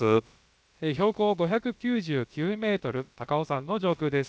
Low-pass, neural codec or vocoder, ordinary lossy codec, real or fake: none; codec, 16 kHz, about 1 kbps, DyCAST, with the encoder's durations; none; fake